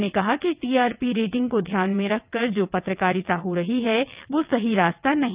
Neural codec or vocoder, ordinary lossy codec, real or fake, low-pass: vocoder, 22.05 kHz, 80 mel bands, WaveNeXt; Opus, 24 kbps; fake; 3.6 kHz